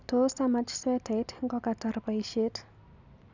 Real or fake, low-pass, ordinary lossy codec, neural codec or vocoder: real; 7.2 kHz; none; none